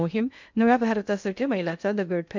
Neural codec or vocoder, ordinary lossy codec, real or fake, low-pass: codec, 16 kHz in and 24 kHz out, 0.6 kbps, FocalCodec, streaming, 2048 codes; MP3, 64 kbps; fake; 7.2 kHz